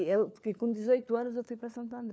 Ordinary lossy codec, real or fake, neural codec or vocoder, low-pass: none; fake; codec, 16 kHz, 4 kbps, FunCodec, trained on LibriTTS, 50 frames a second; none